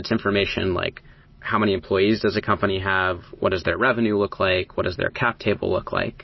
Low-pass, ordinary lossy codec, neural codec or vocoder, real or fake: 7.2 kHz; MP3, 24 kbps; none; real